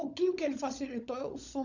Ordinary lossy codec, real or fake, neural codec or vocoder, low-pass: none; fake; codec, 16 kHz, 16 kbps, FunCodec, trained on LibriTTS, 50 frames a second; 7.2 kHz